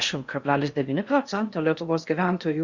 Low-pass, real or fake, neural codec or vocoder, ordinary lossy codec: 7.2 kHz; fake; codec, 16 kHz in and 24 kHz out, 0.6 kbps, FocalCodec, streaming, 4096 codes; Opus, 64 kbps